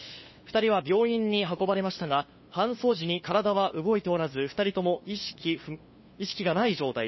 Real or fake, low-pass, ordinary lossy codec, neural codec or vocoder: fake; 7.2 kHz; MP3, 24 kbps; codec, 16 kHz, 2 kbps, FunCodec, trained on LibriTTS, 25 frames a second